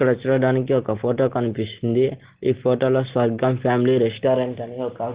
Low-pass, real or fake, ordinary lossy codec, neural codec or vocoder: 3.6 kHz; real; Opus, 16 kbps; none